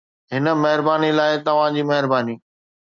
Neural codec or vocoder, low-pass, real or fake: none; 7.2 kHz; real